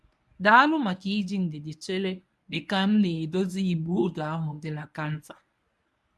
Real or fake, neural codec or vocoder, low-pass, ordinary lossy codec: fake; codec, 24 kHz, 0.9 kbps, WavTokenizer, medium speech release version 1; none; none